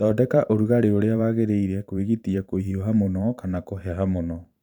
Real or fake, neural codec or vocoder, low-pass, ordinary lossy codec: fake; vocoder, 44.1 kHz, 128 mel bands every 512 samples, BigVGAN v2; 19.8 kHz; none